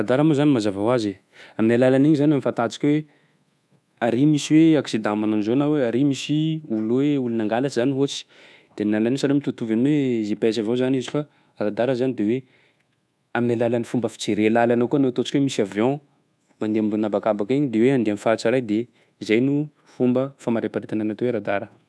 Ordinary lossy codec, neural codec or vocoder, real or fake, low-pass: none; codec, 24 kHz, 1.2 kbps, DualCodec; fake; none